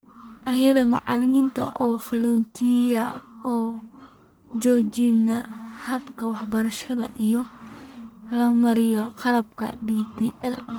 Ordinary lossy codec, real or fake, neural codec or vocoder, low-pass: none; fake; codec, 44.1 kHz, 1.7 kbps, Pupu-Codec; none